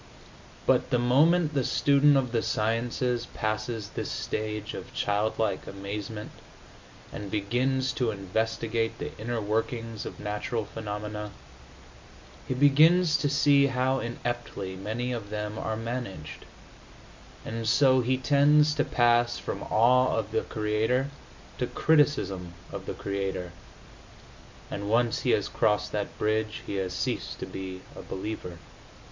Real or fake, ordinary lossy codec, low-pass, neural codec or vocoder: real; MP3, 64 kbps; 7.2 kHz; none